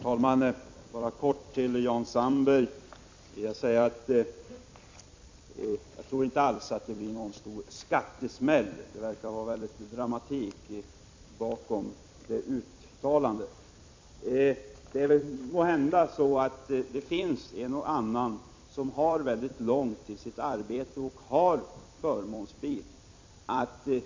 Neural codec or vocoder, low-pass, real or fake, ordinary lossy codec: vocoder, 44.1 kHz, 128 mel bands every 256 samples, BigVGAN v2; 7.2 kHz; fake; MP3, 48 kbps